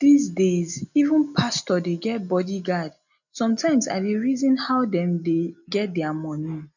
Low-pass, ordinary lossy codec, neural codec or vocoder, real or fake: 7.2 kHz; none; none; real